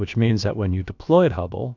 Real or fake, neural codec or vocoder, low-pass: fake; codec, 16 kHz, about 1 kbps, DyCAST, with the encoder's durations; 7.2 kHz